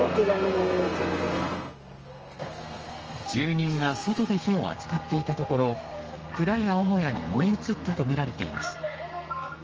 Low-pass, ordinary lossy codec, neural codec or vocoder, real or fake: 7.2 kHz; Opus, 24 kbps; codec, 32 kHz, 1.9 kbps, SNAC; fake